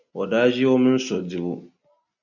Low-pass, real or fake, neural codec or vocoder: 7.2 kHz; real; none